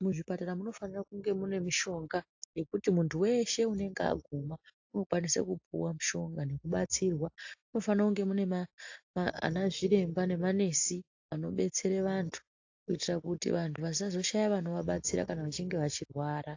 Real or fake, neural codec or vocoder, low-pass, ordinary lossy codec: real; none; 7.2 kHz; AAC, 48 kbps